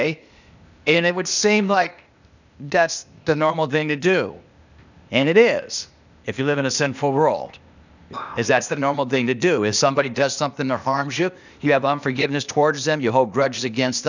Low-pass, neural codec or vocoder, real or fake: 7.2 kHz; codec, 16 kHz, 0.8 kbps, ZipCodec; fake